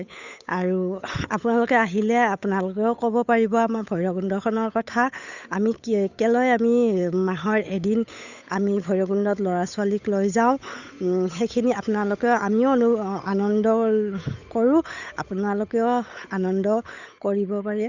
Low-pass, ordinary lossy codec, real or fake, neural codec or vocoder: 7.2 kHz; none; fake; codec, 16 kHz, 8 kbps, FunCodec, trained on Chinese and English, 25 frames a second